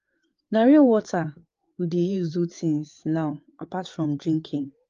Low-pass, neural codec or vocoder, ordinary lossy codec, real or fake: 7.2 kHz; codec, 16 kHz, 4 kbps, FreqCodec, larger model; Opus, 32 kbps; fake